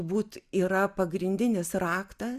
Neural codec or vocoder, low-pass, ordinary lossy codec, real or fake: none; 14.4 kHz; Opus, 64 kbps; real